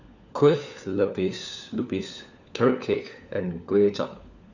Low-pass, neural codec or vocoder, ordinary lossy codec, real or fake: 7.2 kHz; codec, 16 kHz, 4 kbps, FunCodec, trained on LibriTTS, 50 frames a second; none; fake